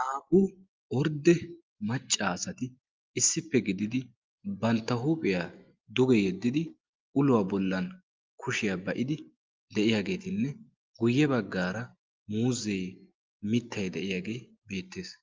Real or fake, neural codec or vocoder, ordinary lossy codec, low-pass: real; none; Opus, 32 kbps; 7.2 kHz